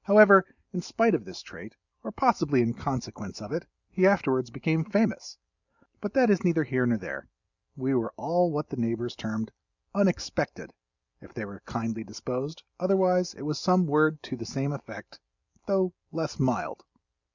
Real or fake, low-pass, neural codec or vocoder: real; 7.2 kHz; none